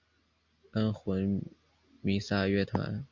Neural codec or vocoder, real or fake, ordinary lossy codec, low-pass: none; real; MP3, 64 kbps; 7.2 kHz